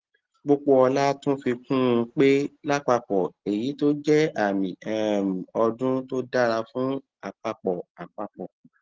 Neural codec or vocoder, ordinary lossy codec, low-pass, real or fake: none; Opus, 16 kbps; 7.2 kHz; real